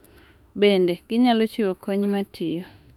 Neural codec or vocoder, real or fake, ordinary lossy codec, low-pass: autoencoder, 48 kHz, 32 numbers a frame, DAC-VAE, trained on Japanese speech; fake; none; 19.8 kHz